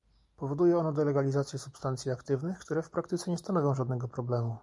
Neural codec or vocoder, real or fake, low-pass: none; real; 10.8 kHz